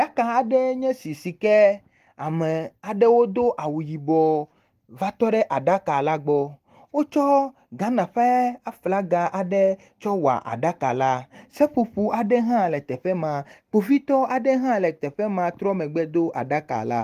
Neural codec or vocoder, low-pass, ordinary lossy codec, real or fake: none; 14.4 kHz; Opus, 24 kbps; real